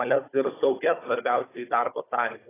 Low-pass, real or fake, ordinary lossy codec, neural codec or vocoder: 3.6 kHz; fake; AAC, 16 kbps; codec, 16 kHz, 16 kbps, FunCodec, trained on Chinese and English, 50 frames a second